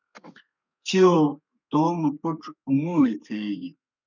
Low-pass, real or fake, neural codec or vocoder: 7.2 kHz; fake; codec, 32 kHz, 1.9 kbps, SNAC